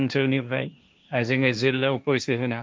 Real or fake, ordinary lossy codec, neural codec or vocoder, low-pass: fake; none; codec, 16 kHz, 1.1 kbps, Voila-Tokenizer; none